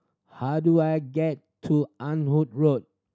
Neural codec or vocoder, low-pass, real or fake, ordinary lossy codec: none; none; real; none